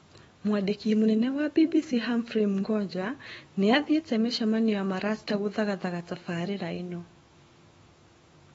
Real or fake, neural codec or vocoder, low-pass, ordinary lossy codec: fake; autoencoder, 48 kHz, 128 numbers a frame, DAC-VAE, trained on Japanese speech; 19.8 kHz; AAC, 24 kbps